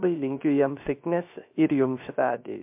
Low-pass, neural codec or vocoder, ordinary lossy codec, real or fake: 3.6 kHz; codec, 16 kHz, 0.3 kbps, FocalCodec; MP3, 32 kbps; fake